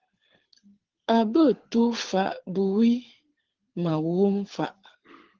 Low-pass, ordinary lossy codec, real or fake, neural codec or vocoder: 7.2 kHz; Opus, 16 kbps; fake; codec, 24 kHz, 6 kbps, HILCodec